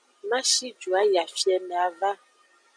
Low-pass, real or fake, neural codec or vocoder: 9.9 kHz; real; none